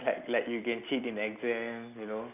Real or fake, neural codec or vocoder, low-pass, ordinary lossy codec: real; none; 3.6 kHz; none